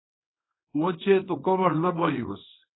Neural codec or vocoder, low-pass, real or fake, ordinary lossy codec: codec, 24 kHz, 0.9 kbps, WavTokenizer, small release; 7.2 kHz; fake; AAC, 16 kbps